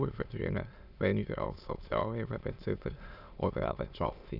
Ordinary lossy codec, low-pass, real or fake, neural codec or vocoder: none; 5.4 kHz; fake; autoencoder, 22.05 kHz, a latent of 192 numbers a frame, VITS, trained on many speakers